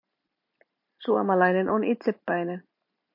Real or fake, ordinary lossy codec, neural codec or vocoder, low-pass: real; MP3, 32 kbps; none; 5.4 kHz